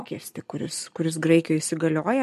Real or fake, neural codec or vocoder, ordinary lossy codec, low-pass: real; none; MP3, 64 kbps; 14.4 kHz